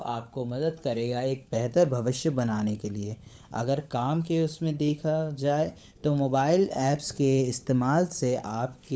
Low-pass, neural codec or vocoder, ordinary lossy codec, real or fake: none; codec, 16 kHz, 16 kbps, FunCodec, trained on LibriTTS, 50 frames a second; none; fake